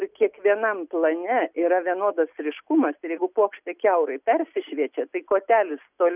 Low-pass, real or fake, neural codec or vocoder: 3.6 kHz; real; none